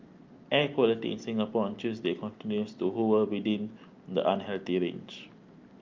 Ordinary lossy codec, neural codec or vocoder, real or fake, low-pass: Opus, 24 kbps; none; real; 7.2 kHz